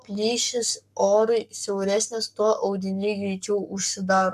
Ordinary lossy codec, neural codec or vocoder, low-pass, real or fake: MP3, 96 kbps; codec, 44.1 kHz, 7.8 kbps, Pupu-Codec; 14.4 kHz; fake